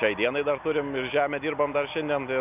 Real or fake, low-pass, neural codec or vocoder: real; 3.6 kHz; none